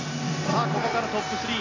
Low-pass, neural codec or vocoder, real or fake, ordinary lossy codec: 7.2 kHz; none; real; AAC, 32 kbps